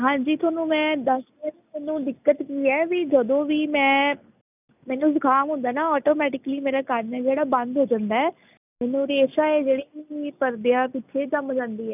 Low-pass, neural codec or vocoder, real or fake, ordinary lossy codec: 3.6 kHz; none; real; none